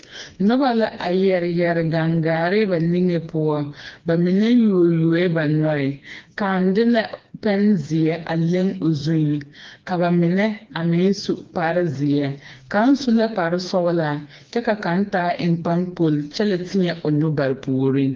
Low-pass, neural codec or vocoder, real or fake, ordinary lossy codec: 7.2 kHz; codec, 16 kHz, 2 kbps, FreqCodec, smaller model; fake; Opus, 32 kbps